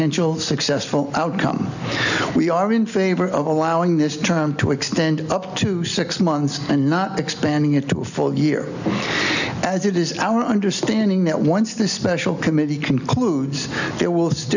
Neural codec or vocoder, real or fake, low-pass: none; real; 7.2 kHz